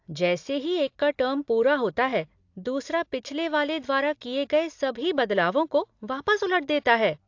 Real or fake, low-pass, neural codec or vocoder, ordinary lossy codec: real; 7.2 kHz; none; AAC, 48 kbps